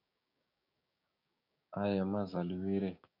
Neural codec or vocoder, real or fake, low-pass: codec, 16 kHz, 6 kbps, DAC; fake; 5.4 kHz